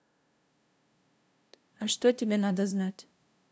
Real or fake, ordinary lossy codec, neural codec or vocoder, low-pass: fake; none; codec, 16 kHz, 0.5 kbps, FunCodec, trained on LibriTTS, 25 frames a second; none